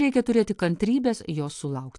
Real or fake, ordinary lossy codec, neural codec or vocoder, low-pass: real; AAC, 64 kbps; none; 10.8 kHz